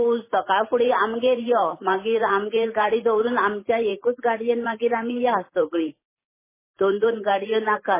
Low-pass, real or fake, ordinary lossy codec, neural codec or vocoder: 3.6 kHz; fake; MP3, 16 kbps; vocoder, 44.1 kHz, 128 mel bands every 512 samples, BigVGAN v2